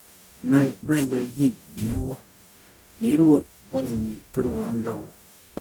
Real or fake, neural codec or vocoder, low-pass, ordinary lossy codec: fake; codec, 44.1 kHz, 0.9 kbps, DAC; 19.8 kHz; none